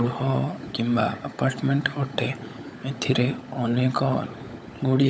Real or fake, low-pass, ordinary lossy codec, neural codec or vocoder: fake; none; none; codec, 16 kHz, 16 kbps, FunCodec, trained on LibriTTS, 50 frames a second